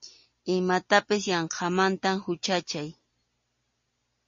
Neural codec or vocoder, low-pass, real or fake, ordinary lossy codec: none; 7.2 kHz; real; MP3, 32 kbps